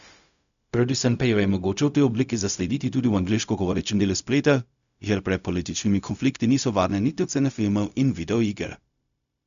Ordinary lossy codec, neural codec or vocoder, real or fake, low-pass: none; codec, 16 kHz, 0.4 kbps, LongCat-Audio-Codec; fake; 7.2 kHz